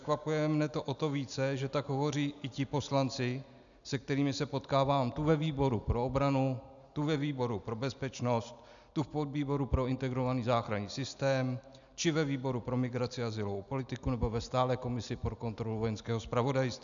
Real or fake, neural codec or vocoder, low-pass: real; none; 7.2 kHz